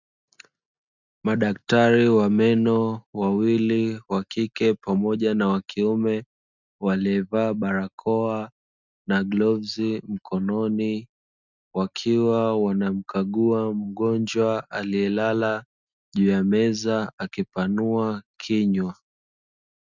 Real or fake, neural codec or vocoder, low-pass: real; none; 7.2 kHz